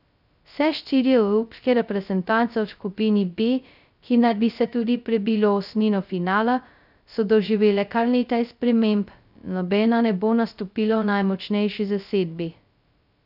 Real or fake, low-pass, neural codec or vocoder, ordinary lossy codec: fake; 5.4 kHz; codec, 16 kHz, 0.2 kbps, FocalCodec; none